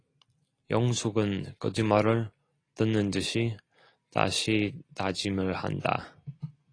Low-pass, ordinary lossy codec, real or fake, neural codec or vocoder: 9.9 kHz; AAC, 32 kbps; real; none